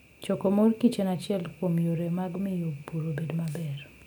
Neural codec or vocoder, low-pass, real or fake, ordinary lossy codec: none; none; real; none